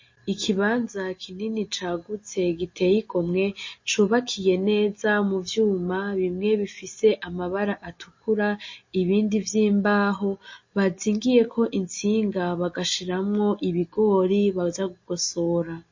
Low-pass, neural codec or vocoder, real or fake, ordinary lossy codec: 7.2 kHz; none; real; MP3, 32 kbps